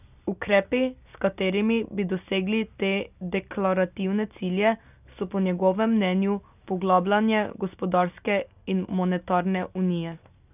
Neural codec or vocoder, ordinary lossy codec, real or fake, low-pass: none; none; real; 3.6 kHz